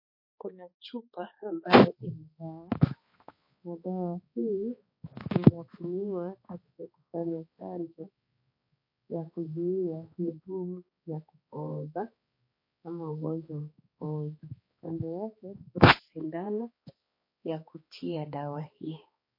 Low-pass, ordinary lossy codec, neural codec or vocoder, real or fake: 5.4 kHz; MP3, 32 kbps; codec, 16 kHz, 2 kbps, X-Codec, HuBERT features, trained on balanced general audio; fake